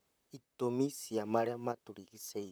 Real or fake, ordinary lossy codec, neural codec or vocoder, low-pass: fake; none; vocoder, 44.1 kHz, 128 mel bands, Pupu-Vocoder; none